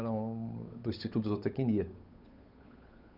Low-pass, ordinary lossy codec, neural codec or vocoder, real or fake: 5.4 kHz; none; codec, 16 kHz, 8 kbps, FunCodec, trained on Chinese and English, 25 frames a second; fake